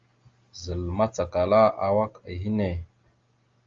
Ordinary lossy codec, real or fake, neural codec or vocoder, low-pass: Opus, 32 kbps; real; none; 7.2 kHz